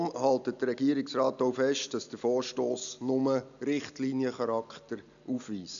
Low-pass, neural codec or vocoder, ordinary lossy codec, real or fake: 7.2 kHz; none; none; real